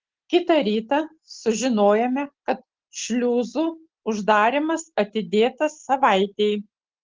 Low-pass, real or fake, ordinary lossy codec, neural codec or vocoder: 7.2 kHz; real; Opus, 16 kbps; none